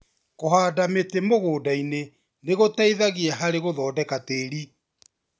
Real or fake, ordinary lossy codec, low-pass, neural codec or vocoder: real; none; none; none